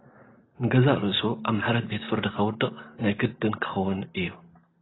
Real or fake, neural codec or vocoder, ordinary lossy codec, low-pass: real; none; AAC, 16 kbps; 7.2 kHz